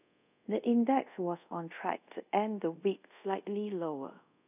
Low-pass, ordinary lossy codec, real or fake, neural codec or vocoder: 3.6 kHz; none; fake; codec, 24 kHz, 0.5 kbps, DualCodec